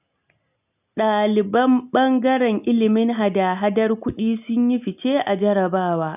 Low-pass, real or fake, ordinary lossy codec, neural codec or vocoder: 3.6 kHz; real; none; none